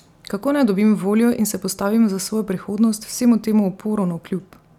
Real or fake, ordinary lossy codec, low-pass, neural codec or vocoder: real; none; 19.8 kHz; none